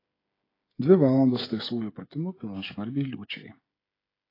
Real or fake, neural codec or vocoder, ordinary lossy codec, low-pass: fake; codec, 16 kHz, 16 kbps, FreqCodec, smaller model; AAC, 24 kbps; 5.4 kHz